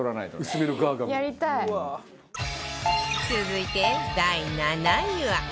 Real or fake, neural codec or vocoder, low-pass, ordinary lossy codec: real; none; none; none